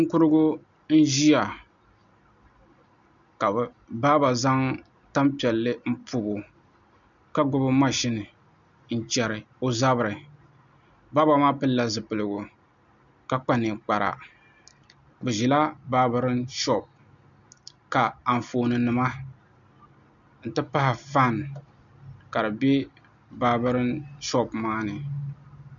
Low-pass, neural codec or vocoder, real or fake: 7.2 kHz; none; real